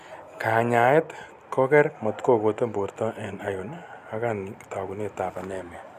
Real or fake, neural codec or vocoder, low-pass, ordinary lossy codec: real; none; 14.4 kHz; none